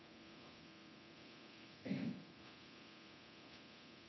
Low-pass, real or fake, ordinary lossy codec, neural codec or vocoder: 7.2 kHz; fake; MP3, 24 kbps; codec, 24 kHz, 0.9 kbps, DualCodec